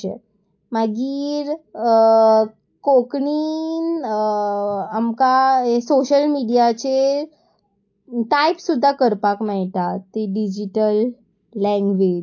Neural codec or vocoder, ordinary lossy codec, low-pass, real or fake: none; AAC, 48 kbps; 7.2 kHz; real